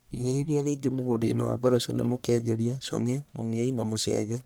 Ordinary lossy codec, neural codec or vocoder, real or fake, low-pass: none; codec, 44.1 kHz, 1.7 kbps, Pupu-Codec; fake; none